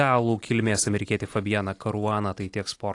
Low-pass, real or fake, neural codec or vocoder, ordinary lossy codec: 10.8 kHz; real; none; AAC, 48 kbps